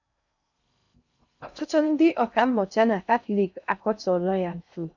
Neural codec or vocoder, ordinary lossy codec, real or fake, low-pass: codec, 16 kHz in and 24 kHz out, 0.6 kbps, FocalCodec, streaming, 2048 codes; none; fake; 7.2 kHz